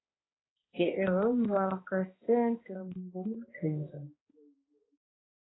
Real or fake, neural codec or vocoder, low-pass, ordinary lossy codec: fake; codec, 16 kHz, 2 kbps, X-Codec, HuBERT features, trained on balanced general audio; 7.2 kHz; AAC, 16 kbps